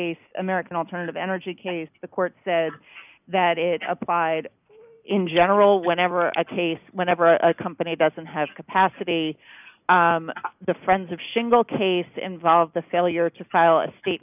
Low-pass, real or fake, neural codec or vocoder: 3.6 kHz; real; none